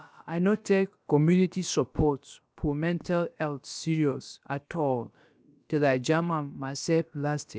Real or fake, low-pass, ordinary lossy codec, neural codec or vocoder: fake; none; none; codec, 16 kHz, about 1 kbps, DyCAST, with the encoder's durations